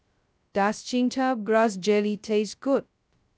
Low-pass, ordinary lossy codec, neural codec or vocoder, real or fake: none; none; codec, 16 kHz, 0.2 kbps, FocalCodec; fake